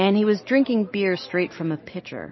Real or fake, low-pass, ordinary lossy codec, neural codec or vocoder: real; 7.2 kHz; MP3, 24 kbps; none